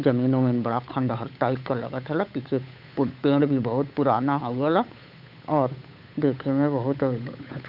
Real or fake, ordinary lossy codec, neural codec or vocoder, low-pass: fake; none; codec, 16 kHz, 8 kbps, FunCodec, trained on Chinese and English, 25 frames a second; 5.4 kHz